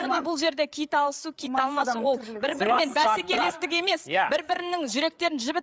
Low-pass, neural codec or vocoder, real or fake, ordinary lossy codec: none; codec, 16 kHz, 8 kbps, FreqCodec, larger model; fake; none